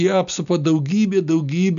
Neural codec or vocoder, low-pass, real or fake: none; 7.2 kHz; real